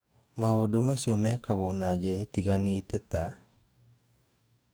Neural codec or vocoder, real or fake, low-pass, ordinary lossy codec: codec, 44.1 kHz, 2.6 kbps, DAC; fake; none; none